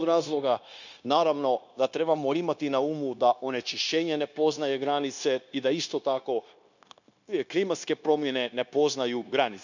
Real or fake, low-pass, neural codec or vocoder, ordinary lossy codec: fake; 7.2 kHz; codec, 16 kHz, 0.9 kbps, LongCat-Audio-Codec; none